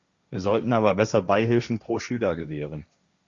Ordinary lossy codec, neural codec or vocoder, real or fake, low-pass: Opus, 64 kbps; codec, 16 kHz, 1.1 kbps, Voila-Tokenizer; fake; 7.2 kHz